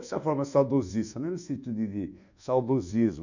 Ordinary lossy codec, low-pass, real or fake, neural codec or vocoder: none; 7.2 kHz; fake; codec, 24 kHz, 1.2 kbps, DualCodec